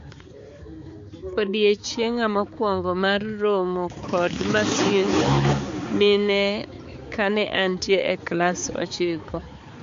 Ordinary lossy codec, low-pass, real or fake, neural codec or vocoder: MP3, 48 kbps; 7.2 kHz; fake; codec, 16 kHz, 4 kbps, X-Codec, HuBERT features, trained on balanced general audio